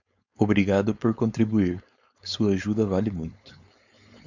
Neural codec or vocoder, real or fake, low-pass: codec, 16 kHz, 4.8 kbps, FACodec; fake; 7.2 kHz